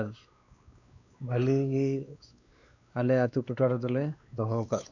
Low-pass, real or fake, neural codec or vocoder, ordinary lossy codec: 7.2 kHz; fake; codec, 16 kHz, 2 kbps, X-Codec, WavLM features, trained on Multilingual LibriSpeech; none